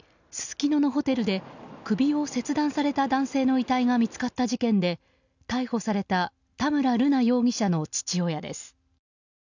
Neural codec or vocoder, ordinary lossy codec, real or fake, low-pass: none; none; real; 7.2 kHz